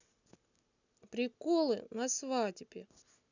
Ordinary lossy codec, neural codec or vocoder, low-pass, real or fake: none; none; 7.2 kHz; real